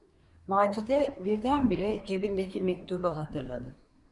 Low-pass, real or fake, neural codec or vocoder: 10.8 kHz; fake; codec, 24 kHz, 1 kbps, SNAC